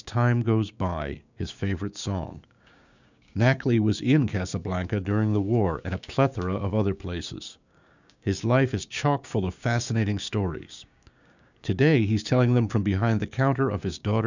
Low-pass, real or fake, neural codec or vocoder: 7.2 kHz; fake; codec, 16 kHz, 6 kbps, DAC